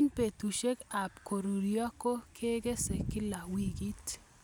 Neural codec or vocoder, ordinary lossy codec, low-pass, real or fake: none; none; none; real